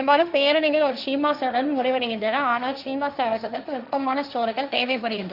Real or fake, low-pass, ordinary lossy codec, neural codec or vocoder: fake; 5.4 kHz; none; codec, 16 kHz, 1.1 kbps, Voila-Tokenizer